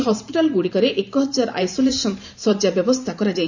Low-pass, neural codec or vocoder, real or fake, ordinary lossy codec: 7.2 kHz; vocoder, 44.1 kHz, 128 mel bands every 512 samples, BigVGAN v2; fake; none